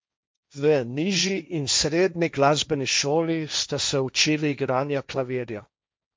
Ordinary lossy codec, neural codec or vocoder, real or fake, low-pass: none; codec, 16 kHz, 1.1 kbps, Voila-Tokenizer; fake; none